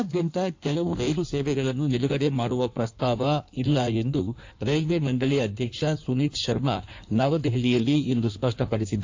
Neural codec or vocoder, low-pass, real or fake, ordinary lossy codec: codec, 16 kHz in and 24 kHz out, 1.1 kbps, FireRedTTS-2 codec; 7.2 kHz; fake; AAC, 48 kbps